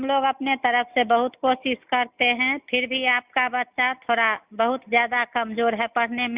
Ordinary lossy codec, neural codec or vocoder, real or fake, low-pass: Opus, 64 kbps; none; real; 3.6 kHz